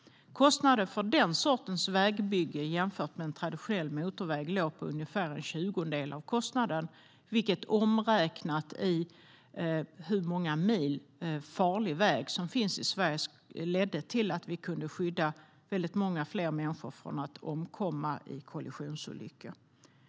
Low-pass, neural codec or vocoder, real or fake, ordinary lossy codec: none; none; real; none